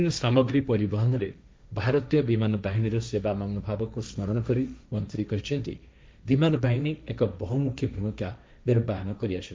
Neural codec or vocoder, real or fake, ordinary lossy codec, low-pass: codec, 16 kHz, 1.1 kbps, Voila-Tokenizer; fake; none; none